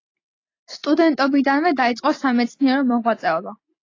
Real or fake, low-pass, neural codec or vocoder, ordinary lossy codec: real; 7.2 kHz; none; AAC, 32 kbps